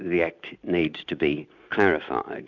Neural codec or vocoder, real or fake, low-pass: none; real; 7.2 kHz